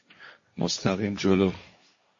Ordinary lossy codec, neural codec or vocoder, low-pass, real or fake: MP3, 32 kbps; codec, 16 kHz, 1.1 kbps, Voila-Tokenizer; 7.2 kHz; fake